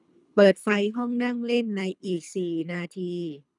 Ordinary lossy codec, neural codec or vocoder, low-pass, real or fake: none; codec, 24 kHz, 3 kbps, HILCodec; 10.8 kHz; fake